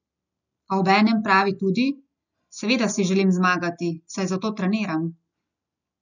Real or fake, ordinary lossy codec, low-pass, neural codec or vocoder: real; none; 7.2 kHz; none